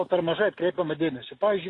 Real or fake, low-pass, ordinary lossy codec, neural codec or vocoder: real; 10.8 kHz; AAC, 32 kbps; none